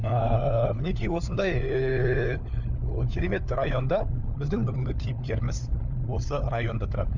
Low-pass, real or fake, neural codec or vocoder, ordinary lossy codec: 7.2 kHz; fake; codec, 16 kHz, 4 kbps, FunCodec, trained on LibriTTS, 50 frames a second; none